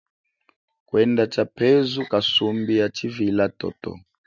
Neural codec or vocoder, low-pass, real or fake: none; 7.2 kHz; real